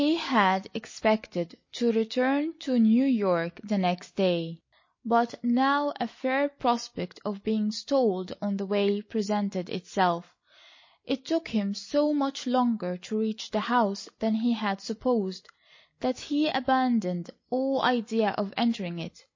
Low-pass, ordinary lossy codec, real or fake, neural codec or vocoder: 7.2 kHz; MP3, 32 kbps; real; none